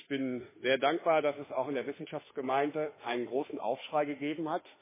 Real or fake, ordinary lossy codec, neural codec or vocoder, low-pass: fake; MP3, 16 kbps; autoencoder, 48 kHz, 32 numbers a frame, DAC-VAE, trained on Japanese speech; 3.6 kHz